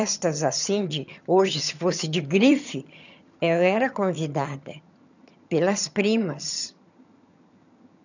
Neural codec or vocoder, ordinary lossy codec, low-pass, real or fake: vocoder, 22.05 kHz, 80 mel bands, HiFi-GAN; none; 7.2 kHz; fake